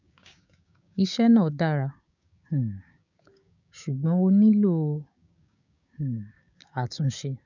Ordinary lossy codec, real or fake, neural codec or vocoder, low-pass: none; fake; codec, 16 kHz, 6 kbps, DAC; 7.2 kHz